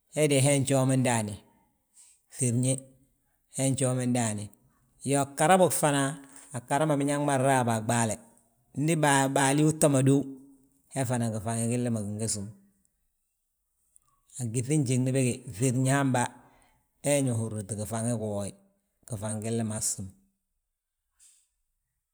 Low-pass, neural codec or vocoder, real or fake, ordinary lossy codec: none; vocoder, 44.1 kHz, 128 mel bands every 512 samples, BigVGAN v2; fake; none